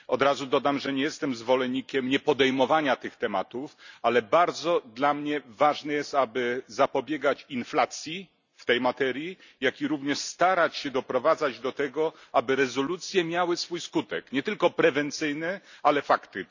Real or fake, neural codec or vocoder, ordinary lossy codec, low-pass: real; none; none; 7.2 kHz